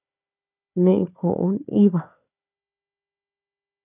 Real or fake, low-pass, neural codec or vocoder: fake; 3.6 kHz; codec, 16 kHz, 4 kbps, FunCodec, trained on Chinese and English, 50 frames a second